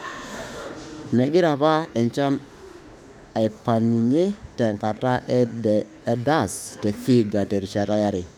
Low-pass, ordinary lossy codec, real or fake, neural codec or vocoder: 19.8 kHz; none; fake; autoencoder, 48 kHz, 32 numbers a frame, DAC-VAE, trained on Japanese speech